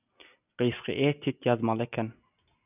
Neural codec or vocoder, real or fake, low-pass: none; real; 3.6 kHz